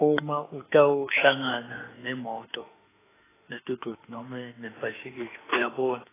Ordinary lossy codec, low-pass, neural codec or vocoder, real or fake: AAC, 16 kbps; 3.6 kHz; autoencoder, 48 kHz, 32 numbers a frame, DAC-VAE, trained on Japanese speech; fake